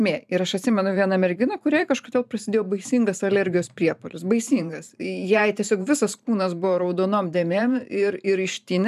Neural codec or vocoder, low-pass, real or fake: vocoder, 44.1 kHz, 128 mel bands every 512 samples, BigVGAN v2; 14.4 kHz; fake